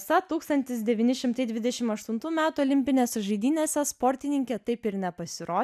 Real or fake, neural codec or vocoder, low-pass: real; none; 14.4 kHz